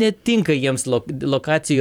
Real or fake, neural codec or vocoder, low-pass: fake; vocoder, 44.1 kHz, 128 mel bands every 512 samples, BigVGAN v2; 19.8 kHz